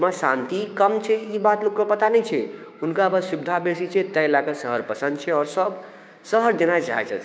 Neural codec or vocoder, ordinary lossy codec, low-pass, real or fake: codec, 16 kHz, 6 kbps, DAC; none; none; fake